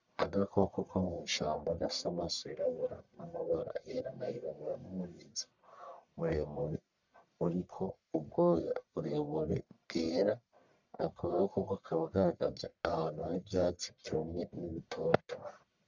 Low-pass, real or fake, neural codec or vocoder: 7.2 kHz; fake; codec, 44.1 kHz, 1.7 kbps, Pupu-Codec